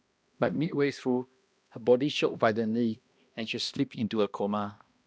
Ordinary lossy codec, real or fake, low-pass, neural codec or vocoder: none; fake; none; codec, 16 kHz, 1 kbps, X-Codec, HuBERT features, trained on balanced general audio